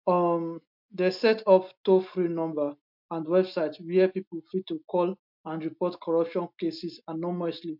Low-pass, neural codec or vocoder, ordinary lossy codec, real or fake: 5.4 kHz; none; MP3, 48 kbps; real